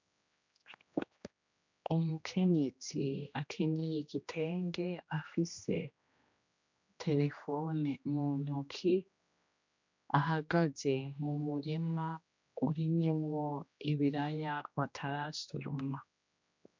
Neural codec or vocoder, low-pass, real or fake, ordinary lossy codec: codec, 16 kHz, 1 kbps, X-Codec, HuBERT features, trained on general audio; 7.2 kHz; fake; MP3, 64 kbps